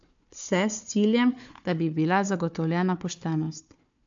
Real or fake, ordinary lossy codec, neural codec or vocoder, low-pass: fake; AAC, 64 kbps; codec, 16 kHz, 4 kbps, FunCodec, trained on Chinese and English, 50 frames a second; 7.2 kHz